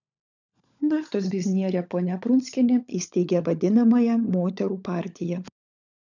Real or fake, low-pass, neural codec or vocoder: fake; 7.2 kHz; codec, 16 kHz, 16 kbps, FunCodec, trained on LibriTTS, 50 frames a second